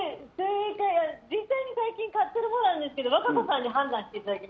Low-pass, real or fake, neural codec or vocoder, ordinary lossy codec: none; real; none; none